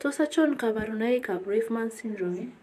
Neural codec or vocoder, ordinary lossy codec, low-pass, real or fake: vocoder, 44.1 kHz, 128 mel bands, Pupu-Vocoder; AAC, 96 kbps; 14.4 kHz; fake